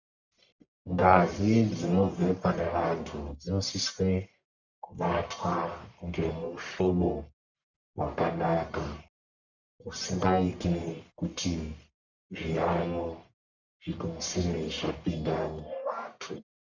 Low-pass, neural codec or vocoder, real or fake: 7.2 kHz; codec, 44.1 kHz, 1.7 kbps, Pupu-Codec; fake